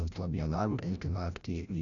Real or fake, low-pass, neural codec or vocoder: fake; 7.2 kHz; codec, 16 kHz, 0.5 kbps, FreqCodec, larger model